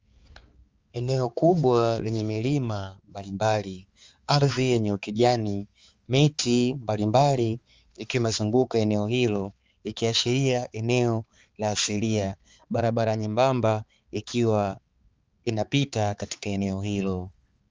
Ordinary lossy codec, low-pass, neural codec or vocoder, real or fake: Opus, 32 kbps; 7.2 kHz; codec, 16 kHz, 4 kbps, X-Codec, HuBERT features, trained on balanced general audio; fake